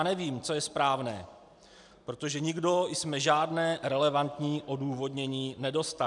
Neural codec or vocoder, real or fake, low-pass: none; real; 10.8 kHz